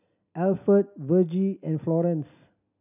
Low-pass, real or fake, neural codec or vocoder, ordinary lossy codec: 3.6 kHz; real; none; none